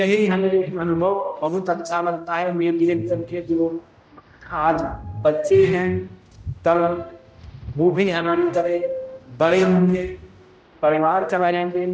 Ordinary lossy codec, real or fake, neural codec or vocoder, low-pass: none; fake; codec, 16 kHz, 0.5 kbps, X-Codec, HuBERT features, trained on general audio; none